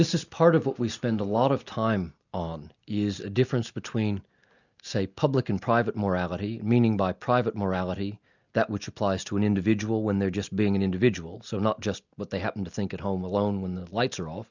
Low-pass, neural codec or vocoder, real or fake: 7.2 kHz; none; real